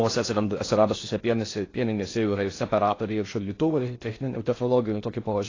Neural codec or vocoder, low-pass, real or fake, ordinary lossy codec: codec, 16 kHz in and 24 kHz out, 0.6 kbps, FocalCodec, streaming, 4096 codes; 7.2 kHz; fake; AAC, 32 kbps